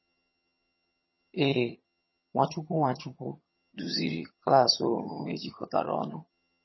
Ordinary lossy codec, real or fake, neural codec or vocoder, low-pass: MP3, 24 kbps; fake; vocoder, 22.05 kHz, 80 mel bands, HiFi-GAN; 7.2 kHz